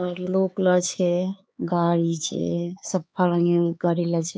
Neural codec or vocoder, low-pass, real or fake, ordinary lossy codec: codec, 16 kHz, 4 kbps, X-Codec, HuBERT features, trained on LibriSpeech; none; fake; none